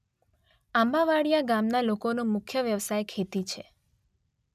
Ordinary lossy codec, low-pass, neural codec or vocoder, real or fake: none; 14.4 kHz; none; real